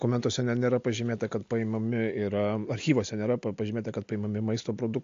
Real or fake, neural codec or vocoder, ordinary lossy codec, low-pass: real; none; AAC, 48 kbps; 7.2 kHz